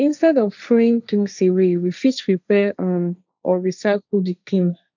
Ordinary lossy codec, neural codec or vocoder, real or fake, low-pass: none; codec, 16 kHz, 1.1 kbps, Voila-Tokenizer; fake; 7.2 kHz